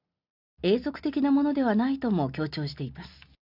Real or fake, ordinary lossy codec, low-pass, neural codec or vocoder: real; none; 5.4 kHz; none